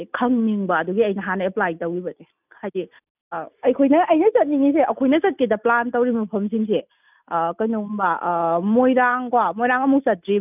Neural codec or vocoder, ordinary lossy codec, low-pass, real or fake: none; none; 3.6 kHz; real